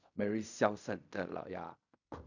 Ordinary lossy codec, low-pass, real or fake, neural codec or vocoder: none; 7.2 kHz; fake; codec, 16 kHz in and 24 kHz out, 0.4 kbps, LongCat-Audio-Codec, fine tuned four codebook decoder